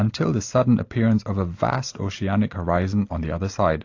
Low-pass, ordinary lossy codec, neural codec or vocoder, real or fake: 7.2 kHz; AAC, 48 kbps; none; real